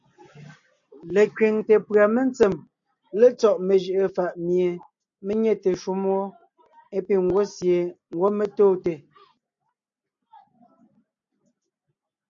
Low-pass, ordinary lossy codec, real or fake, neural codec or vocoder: 7.2 kHz; AAC, 64 kbps; real; none